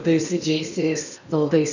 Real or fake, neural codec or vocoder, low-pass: fake; codec, 16 kHz in and 24 kHz out, 0.8 kbps, FocalCodec, streaming, 65536 codes; 7.2 kHz